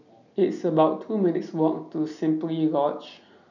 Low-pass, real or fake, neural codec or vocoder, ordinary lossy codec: 7.2 kHz; real; none; none